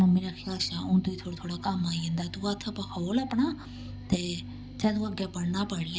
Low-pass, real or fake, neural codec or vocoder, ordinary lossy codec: none; real; none; none